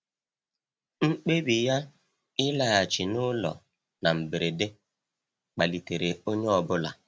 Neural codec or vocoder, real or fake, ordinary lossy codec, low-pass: none; real; none; none